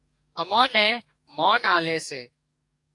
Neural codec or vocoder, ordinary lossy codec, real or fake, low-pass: codec, 44.1 kHz, 2.6 kbps, DAC; AAC, 64 kbps; fake; 10.8 kHz